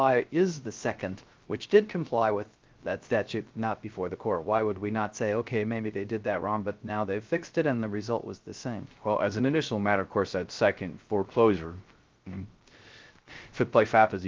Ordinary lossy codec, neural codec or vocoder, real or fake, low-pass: Opus, 32 kbps; codec, 16 kHz, 0.3 kbps, FocalCodec; fake; 7.2 kHz